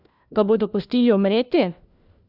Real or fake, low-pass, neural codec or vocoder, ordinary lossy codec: fake; 5.4 kHz; codec, 16 kHz, 1 kbps, FunCodec, trained on LibriTTS, 50 frames a second; Opus, 64 kbps